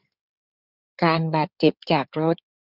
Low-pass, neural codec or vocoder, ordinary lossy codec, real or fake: 5.4 kHz; codec, 16 kHz in and 24 kHz out, 2.2 kbps, FireRedTTS-2 codec; none; fake